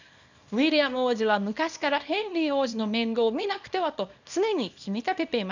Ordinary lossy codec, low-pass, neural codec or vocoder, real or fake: none; 7.2 kHz; codec, 24 kHz, 0.9 kbps, WavTokenizer, small release; fake